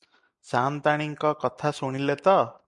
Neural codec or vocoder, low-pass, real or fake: none; 10.8 kHz; real